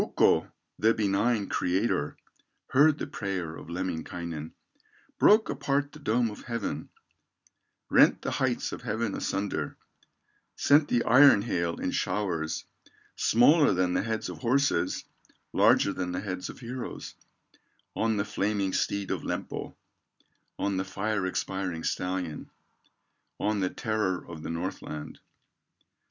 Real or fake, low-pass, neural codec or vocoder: real; 7.2 kHz; none